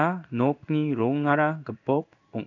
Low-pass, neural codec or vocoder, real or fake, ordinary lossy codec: 7.2 kHz; codec, 16 kHz in and 24 kHz out, 1 kbps, XY-Tokenizer; fake; none